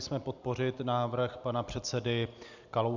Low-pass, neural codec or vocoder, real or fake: 7.2 kHz; vocoder, 44.1 kHz, 128 mel bands every 256 samples, BigVGAN v2; fake